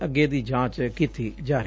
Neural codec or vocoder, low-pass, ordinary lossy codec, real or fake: none; none; none; real